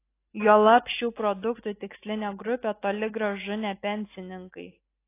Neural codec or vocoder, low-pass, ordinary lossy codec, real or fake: none; 3.6 kHz; AAC, 24 kbps; real